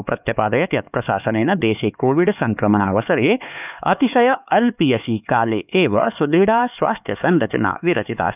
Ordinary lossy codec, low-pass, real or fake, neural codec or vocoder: none; 3.6 kHz; fake; codec, 16 kHz, 4 kbps, X-Codec, HuBERT features, trained on LibriSpeech